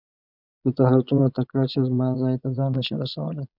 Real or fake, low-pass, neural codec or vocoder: fake; 5.4 kHz; vocoder, 22.05 kHz, 80 mel bands, Vocos